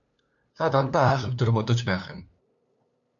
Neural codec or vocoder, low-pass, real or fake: codec, 16 kHz, 2 kbps, FunCodec, trained on LibriTTS, 25 frames a second; 7.2 kHz; fake